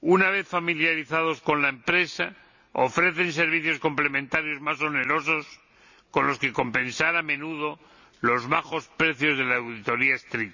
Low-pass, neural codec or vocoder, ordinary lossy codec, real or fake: 7.2 kHz; none; none; real